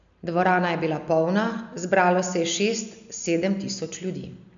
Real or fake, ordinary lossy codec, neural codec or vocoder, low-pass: real; none; none; 7.2 kHz